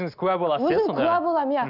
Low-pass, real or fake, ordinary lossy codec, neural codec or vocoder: 5.4 kHz; real; none; none